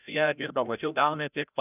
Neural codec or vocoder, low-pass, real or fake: codec, 16 kHz, 0.5 kbps, FreqCodec, larger model; 3.6 kHz; fake